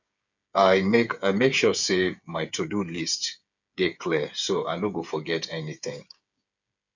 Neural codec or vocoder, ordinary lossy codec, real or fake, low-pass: codec, 16 kHz, 8 kbps, FreqCodec, smaller model; none; fake; 7.2 kHz